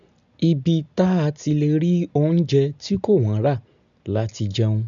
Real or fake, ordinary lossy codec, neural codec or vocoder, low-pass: real; none; none; 7.2 kHz